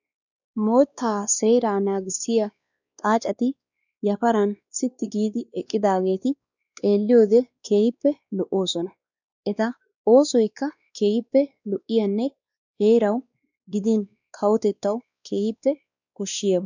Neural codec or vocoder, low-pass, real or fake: codec, 16 kHz, 2 kbps, X-Codec, WavLM features, trained on Multilingual LibriSpeech; 7.2 kHz; fake